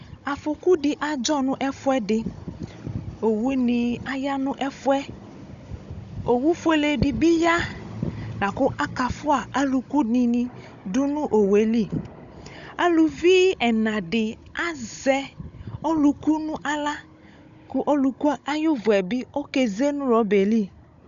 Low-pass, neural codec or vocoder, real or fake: 7.2 kHz; codec, 16 kHz, 16 kbps, FunCodec, trained on Chinese and English, 50 frames a second; fake